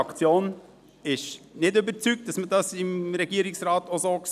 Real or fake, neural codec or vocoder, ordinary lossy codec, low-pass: real; none; none; 14.4 kHz